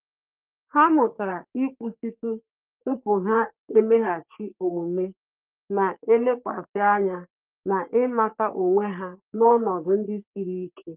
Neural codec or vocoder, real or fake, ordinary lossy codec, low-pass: codec, 44.1 kHz, 3.4 kbps, Pupu-Codec; fake; Opus, 24 kbps; 3.6 kHz